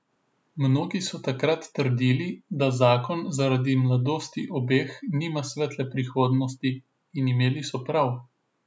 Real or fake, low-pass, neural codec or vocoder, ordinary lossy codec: real; none; none; none